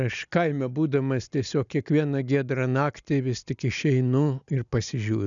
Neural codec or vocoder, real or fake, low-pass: none; real; 7.2 kHz